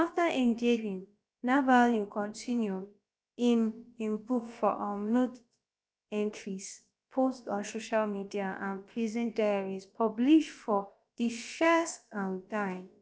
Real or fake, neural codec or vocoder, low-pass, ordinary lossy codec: fake; codec, 16 kHz, about 1 kbps, DyCAST, with the encoder's durations; none; none